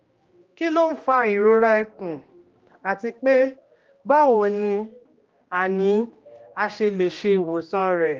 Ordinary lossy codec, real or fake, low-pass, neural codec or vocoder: Opus, 32 kbps; fake; 7.2 kHz; codec, 16 kHz, 1 kbps, X-Codec, HuBERT features, trained on general audio